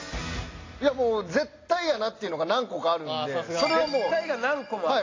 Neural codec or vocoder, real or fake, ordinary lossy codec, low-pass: none; real; AAC, 32 kbps; 7.2 kHz